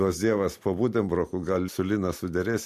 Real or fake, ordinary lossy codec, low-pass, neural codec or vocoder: real; MP3, 64 kbps; 14.4 kHz; none